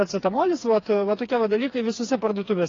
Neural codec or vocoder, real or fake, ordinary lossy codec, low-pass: codec, 16 kHz, 4 kbps, FreqCodec, smaller model; fake; AAC, 32 kbps; 7.2 kHz